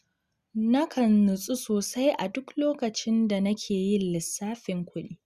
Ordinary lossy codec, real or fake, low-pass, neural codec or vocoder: Opus, 64 kbps; real; 14.4 kHz; none